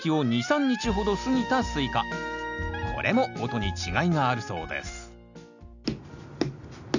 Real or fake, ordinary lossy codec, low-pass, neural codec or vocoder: real; none; 7.2 kHz; none